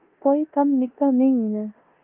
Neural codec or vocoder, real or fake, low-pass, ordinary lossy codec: codec, 16 kHz in and 24 kHz out, 0.9 kbps, LongCat-Audio-Codec, four codebook decoder; fake; 3.6 kHz; Opus, 32 kbps